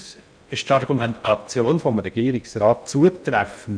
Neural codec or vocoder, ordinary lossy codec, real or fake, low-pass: codec, 16 kHz in and 24 kHz out, 0.6 kbps, FocalCodec, streaming, 2048 codes; none; fake; 9.9 kHz